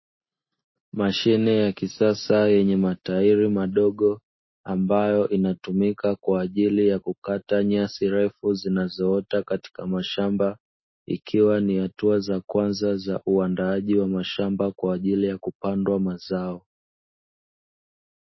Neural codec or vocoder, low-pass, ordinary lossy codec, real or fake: none; 7.2 kHz; MP3, 24 kbps; real